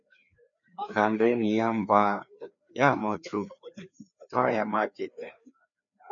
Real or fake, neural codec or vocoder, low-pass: fake; codec, 16 kHz, 2 kbps, FreqCodec, larger model; 7.2 kHz